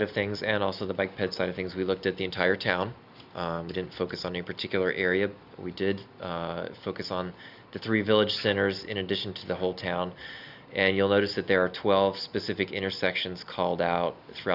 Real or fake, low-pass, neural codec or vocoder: real; 5.4 kHz; none